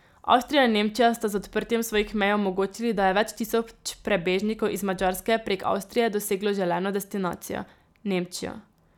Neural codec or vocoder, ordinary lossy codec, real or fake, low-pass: none; none; real; 19.8 kHz